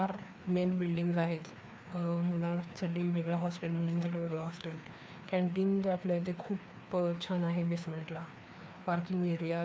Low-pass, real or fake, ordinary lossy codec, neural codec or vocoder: none; fake; none; codec, 16 kHz, 2 kbps, FreqCodec, larger model